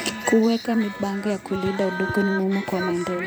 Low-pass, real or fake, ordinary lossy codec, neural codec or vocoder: none; fake; none; vocoder, 44.1 kHz, 128 mel bands every 512 samples, BigVGAN v2